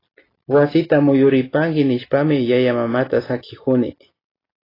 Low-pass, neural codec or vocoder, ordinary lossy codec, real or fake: 5.4 kHz; none; AAC, 24 kbps; real